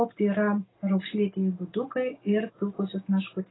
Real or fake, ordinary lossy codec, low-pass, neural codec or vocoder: real; AAC, 16 kbps; 7.2 kHz; none